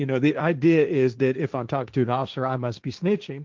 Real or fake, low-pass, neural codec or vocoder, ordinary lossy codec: fake; 7.2 kHz; codec, 16 kHz, 1.1 kbps, Voila-Tokenizer; Opus, 24 kbps